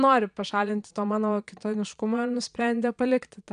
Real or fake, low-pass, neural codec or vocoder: fake; 9.9 kHz; vocoder, 22.05 kHz, 80 mel bands, Vocos